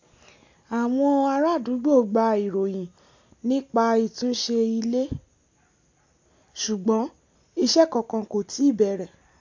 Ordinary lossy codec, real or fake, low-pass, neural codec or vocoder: AAC, 48 kbps; real; 7.2 kHz; none